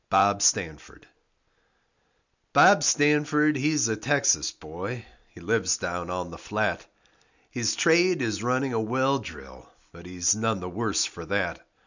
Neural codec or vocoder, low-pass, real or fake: none; 7.2 kHz; real